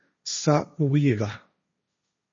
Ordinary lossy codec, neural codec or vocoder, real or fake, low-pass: MP3, 32 kbps; codec, 16 kHz, 1.1 kbps, Voila-Tokenizer; fake; 7.2 kHz